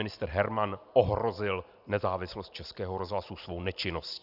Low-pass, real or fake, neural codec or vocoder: 5.4 kHz; real; none